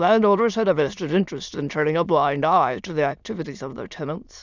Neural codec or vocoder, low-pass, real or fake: autoencoder, 22.05 kHz, a latent of 192 numbers a frame, VITS, trained on many speakers; 7.2 kHz; fake